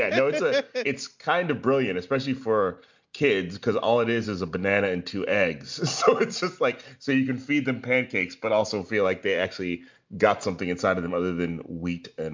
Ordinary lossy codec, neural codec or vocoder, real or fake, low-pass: MP3, 64 kbps; none; real; 7.2 kHz